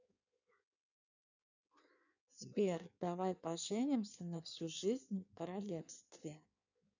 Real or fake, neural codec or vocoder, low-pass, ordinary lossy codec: fake; codec, 16 kHz in and 24 kHz out, 1.1 kbps, FireRedTTS-2 codec; 7.2 kHz; none